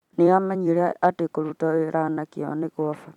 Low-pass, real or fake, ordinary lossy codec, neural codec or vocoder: 19.8 kHz; fake; none; vocoder, 44.1 kHz, 128 mel bands every 256 samples, BigVGAN v2